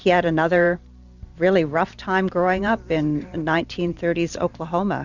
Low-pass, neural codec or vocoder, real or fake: 7.2 kHz; vocoder, 44.1 kHz, 128 mel bands every 512 samples, BigVGAN v2; fake